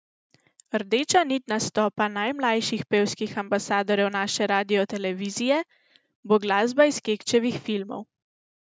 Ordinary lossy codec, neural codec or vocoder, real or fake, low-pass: none; none; real; none